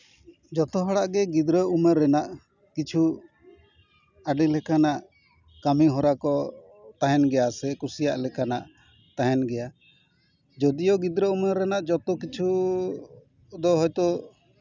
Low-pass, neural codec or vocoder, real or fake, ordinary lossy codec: 7.2 kHz; none; real; none